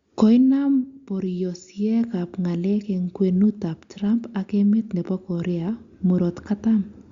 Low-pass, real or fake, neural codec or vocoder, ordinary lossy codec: 7.2 kHz; real; none; Opus, 64 kbps